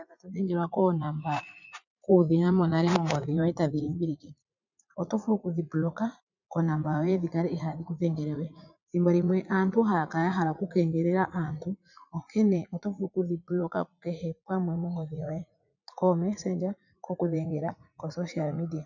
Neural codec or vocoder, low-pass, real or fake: vocoder, 44.1 kHz, 80 mel bands, Vocos; 7.2 kHz; fake